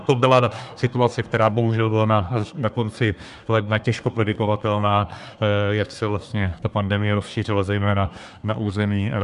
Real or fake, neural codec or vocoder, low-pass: fake; codec, 24 kHz, 1 kbps, SNAC; 10.8 kHz